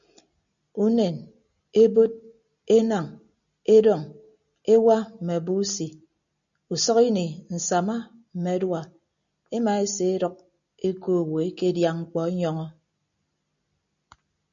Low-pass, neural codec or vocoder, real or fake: 7.2 kHz; none; real